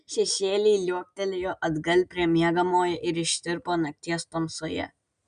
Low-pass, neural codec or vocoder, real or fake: 9.9 kHz; none; real